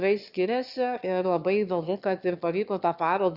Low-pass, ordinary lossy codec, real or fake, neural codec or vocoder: 5.4 kHz; Opus, 64 kbps; fake; autoencoder, 22.05 kHz, a latent of 192 numbers a frame, VITS, trained on one speaker